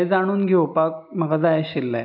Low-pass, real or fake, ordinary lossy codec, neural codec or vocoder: 5.4 kHz; real; none; none